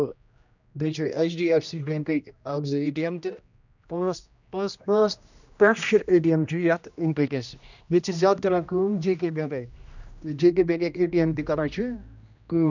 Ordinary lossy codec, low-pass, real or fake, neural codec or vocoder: none; 7.2 kHz; fake; codec, 16 kHz, 1 kbps, X-Codec, HuBERT features, trained on general audio